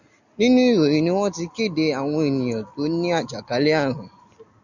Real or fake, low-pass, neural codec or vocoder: real; 7.2 kHz; none